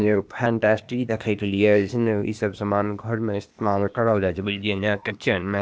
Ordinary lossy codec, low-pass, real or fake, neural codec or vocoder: none; none; fake; codec, 16 kHz, 0.8 kbps, ZipCodec